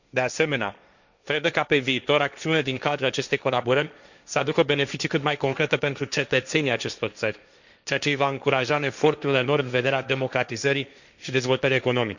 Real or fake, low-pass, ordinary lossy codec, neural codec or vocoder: fake; 7.2 kHz; none; codec, 16 kHz, 1.1 kbps, Voila-Tokenizer